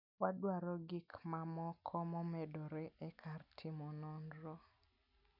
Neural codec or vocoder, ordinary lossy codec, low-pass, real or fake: none; none; 5.4 kHz; real